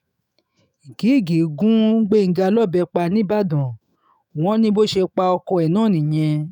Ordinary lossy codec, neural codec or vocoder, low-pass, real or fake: none; autoencoder, 48 kHz, 128 numbers a frame, DAC-VAE, trained on Japanese speech; 19.8 kHz; fake